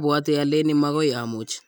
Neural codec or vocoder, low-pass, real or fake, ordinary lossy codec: none; none; real; none